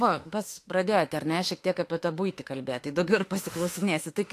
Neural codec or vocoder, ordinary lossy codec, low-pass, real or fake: autoencoder, 48 kHz, 32 numbers a frame, DAC-VAE, trained on Japanese speech; AAC, 64 kbps; 14.4 kHz; fake